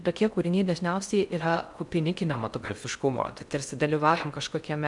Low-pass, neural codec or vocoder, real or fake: 10.8 kHz; codec, 16 kHz in and 24 kHz out, 0.6 kbps, FocalCodec, streaming, 4096 codes; fake